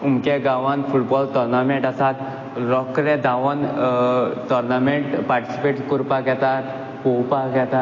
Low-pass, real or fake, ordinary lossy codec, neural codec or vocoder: 7.2 kHz; real; MP3, 32 kbps; none